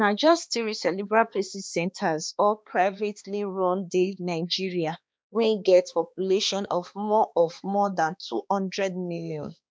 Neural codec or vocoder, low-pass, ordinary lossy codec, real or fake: codec, 16 kHz, 2 kbps, X-Codec, HuBERT features, trained on balanced general audio; none; none; fake